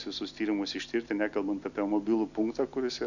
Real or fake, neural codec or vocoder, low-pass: real; none; 7.2 kHz